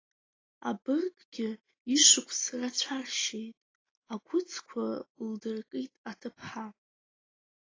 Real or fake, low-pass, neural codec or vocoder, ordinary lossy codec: real; 7.2 kHz; none; AAC, 32 kbps